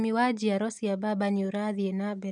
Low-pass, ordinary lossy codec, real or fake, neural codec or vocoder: 10.8 kHz; none; real; none